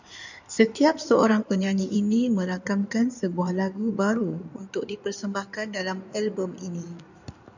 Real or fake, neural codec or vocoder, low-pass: fake; codec, 16 kHz in and 24 kHz out, 2.2 kbps, FireRedTTS-2 codec; 7.2 kHz